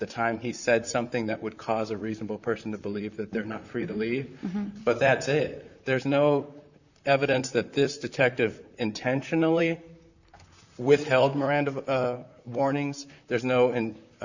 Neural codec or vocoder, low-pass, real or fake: vocoder, 44.1 kHz, 128 mel bands, Pupu-Vocoder; 7.2 kHz; fake